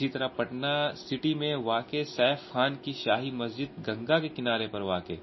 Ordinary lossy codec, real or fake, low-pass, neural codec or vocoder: MP3, 24 kbps; real; 7.2 kHz; none